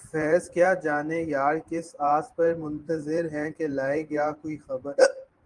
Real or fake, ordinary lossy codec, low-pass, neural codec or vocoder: fake; Opus, 24 kbps; 10.8 kHz; vocoder, 44.1 kHz, 128 mel bands every 512 samples, BigVGAN v2